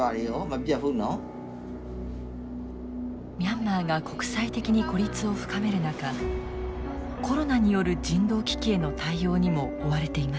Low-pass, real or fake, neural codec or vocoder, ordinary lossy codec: none; real; none; none